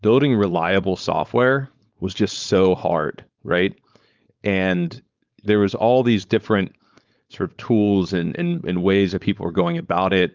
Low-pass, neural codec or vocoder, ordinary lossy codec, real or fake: 7.2 kHz; codec, 16 kHz, 4.8 kbps, FACodec; Opus, 24 kbps; fake